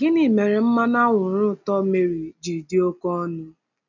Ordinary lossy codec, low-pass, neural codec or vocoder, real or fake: none; 7.2 kHz; none; real